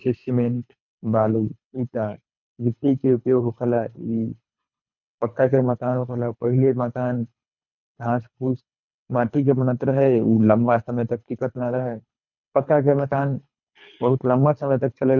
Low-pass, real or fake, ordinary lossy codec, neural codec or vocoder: 7.2 kHz; fake; none; codec, 24 kHz, 3 kbps, HILCodec